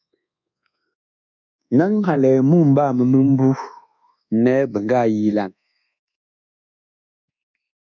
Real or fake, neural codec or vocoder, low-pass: fake; codec, 24 kHz, 1.2 kbps, DualCodec; 7.2 kHz